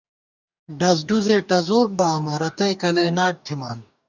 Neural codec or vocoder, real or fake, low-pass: codec, 44.1 kHz, 2.6 kbps, DAC; fake; 7.2 kHz